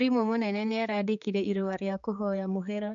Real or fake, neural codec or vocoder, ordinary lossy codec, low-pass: fake; codec, 16 kHz, 4 kbps, X-Codec, HuBERT features, trained on general audio; none; 7.2 kHz